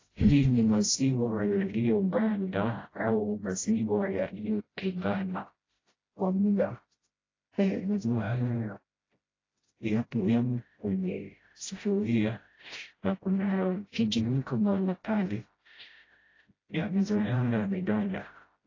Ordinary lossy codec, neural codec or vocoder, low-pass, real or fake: AAC, 32 kbps; codec, 16 kHz, 0.5 kbps, FreqCodec, smaller model; 7.2 kHz; fake